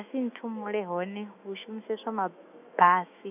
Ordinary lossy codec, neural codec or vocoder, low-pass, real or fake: none; autoencoder, 48 kHz, 128 numbers a frame, DAC-VAE, trained on Japanese speech; 3.6 kHz; fake